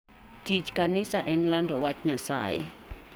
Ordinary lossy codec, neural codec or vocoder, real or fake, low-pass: none; codec, 44.1 kHz, 2.6 kbps, SNAC; fake; none